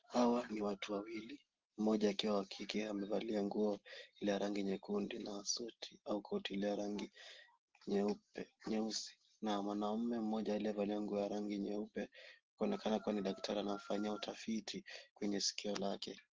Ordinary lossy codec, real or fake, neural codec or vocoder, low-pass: Opus, 16 kbps; real; none; 7.2 kHz